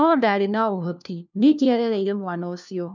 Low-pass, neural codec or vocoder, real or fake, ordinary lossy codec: 7.2 kHz; codec, 16 kHz, 1 kbps, FunCodec, trained on LibriTTS, 50 frames a second; fake; none